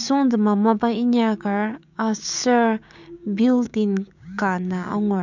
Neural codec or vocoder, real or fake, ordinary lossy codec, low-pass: codec, 16 kHz, 6 kbps, DAC; fake; none; 7.2 kHz